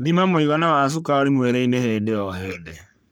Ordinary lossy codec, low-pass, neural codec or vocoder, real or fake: none; none; codec, 44.1 kHz, 3.4 kbps, Pupu-Codec; fake